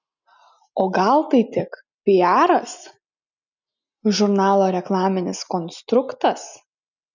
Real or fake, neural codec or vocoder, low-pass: real; none; 7.2 kHz